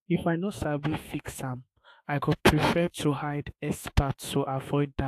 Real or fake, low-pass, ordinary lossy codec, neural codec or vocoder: fake; 14.4 kHz; AAC, 64 kbps; autoencoder, 48 kHz, 32 numbers a frame, DAC-VAE, trained on Japanese speech